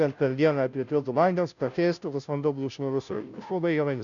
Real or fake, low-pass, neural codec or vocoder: fake; 7.2 kHz; codec, 16 kHz, 0.5 kbps, FunCodec, trained on Chinese and English, 25 frames a second